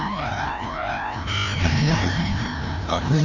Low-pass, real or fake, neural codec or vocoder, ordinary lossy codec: 7.2 kHz; fake; codec, 16 kHz, 1 kbps, FreqCodec, larger model; none